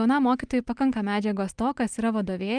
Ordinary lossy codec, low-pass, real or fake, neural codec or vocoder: Opus, 32 kbps; 9.9 kHz; real; none